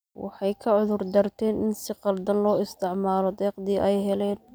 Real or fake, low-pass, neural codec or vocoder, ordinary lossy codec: real; none; none; none